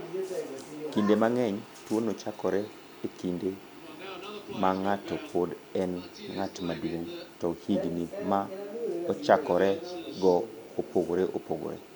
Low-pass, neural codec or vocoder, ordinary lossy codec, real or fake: none; none; none; real